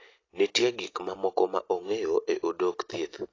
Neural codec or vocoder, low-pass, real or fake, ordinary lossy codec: none; 7.2 kHz; real; AAC, 32 kbps